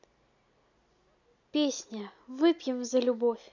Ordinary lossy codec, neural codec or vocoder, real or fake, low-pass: none; none; real; 7.2 kHz